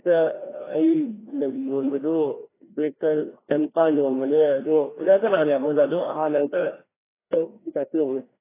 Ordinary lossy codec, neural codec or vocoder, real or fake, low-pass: AAC, 16 kbps; codec, 16 kHz, 1 kbps, FreqCodec, larger model; fake; 3.6 kHz